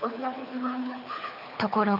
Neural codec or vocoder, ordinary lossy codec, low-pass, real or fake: codec, 24 kHz, 6 kbps, HILCodec; none; 5.4 kHz; fake